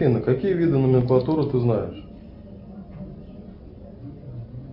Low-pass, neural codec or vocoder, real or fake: 5.4 kHz; none; real